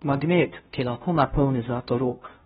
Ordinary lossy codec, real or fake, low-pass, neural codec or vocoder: AAC, 16 kbps; fake; 7.2 kHz; codec, 16 kHz, 0.5 kbps, FunCodec, trained on Chinese and English, 25 frames a second